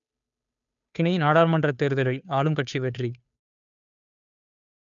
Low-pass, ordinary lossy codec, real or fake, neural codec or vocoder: 7.2 kHz; none; fake; codec, 16 kHz, 2 kbps, FunCodec, trained on Chinese and English, 25 frames a second